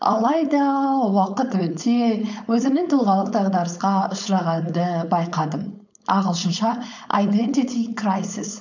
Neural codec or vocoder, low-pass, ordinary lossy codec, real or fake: codec, 16 kHz, 4.8 kbps, FACodec; 7.2 kHz; none; fake